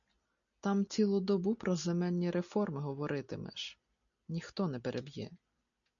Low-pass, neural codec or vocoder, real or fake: 7.2 kHz; none; real